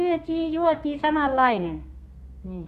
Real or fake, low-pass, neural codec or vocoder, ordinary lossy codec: fake; 14.4 kHz; codec, 32 kHz, 1.9 kbps, SNAC; none